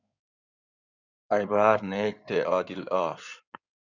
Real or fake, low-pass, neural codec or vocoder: fake; 7.2 kHz; codec, 16 kHz in and 24 kHz out, 2.2 kbps, FireRedTTS-2 codec